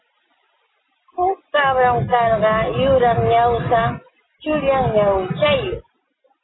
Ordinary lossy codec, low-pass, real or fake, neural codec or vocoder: AAC, 16 kbps; 7.2 kHz; real; none